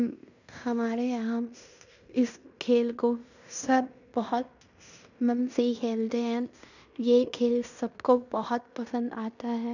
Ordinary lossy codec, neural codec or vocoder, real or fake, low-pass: none; codec, 16 kHz in and 24 kHz out, 0.9 kbps, LongCat-Audio-Codec, fine tuned four codebook decoder; fake; 7.2 kHz